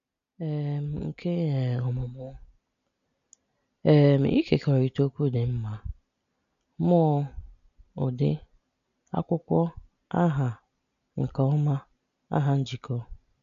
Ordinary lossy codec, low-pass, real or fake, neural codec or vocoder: none; 7.2 kHz; real; none